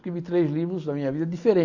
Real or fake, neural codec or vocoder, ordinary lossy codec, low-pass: real; none; AAC, 48 kbps; 7.2 kHz